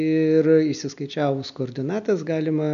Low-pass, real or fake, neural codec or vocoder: 7.2 kHz; real; none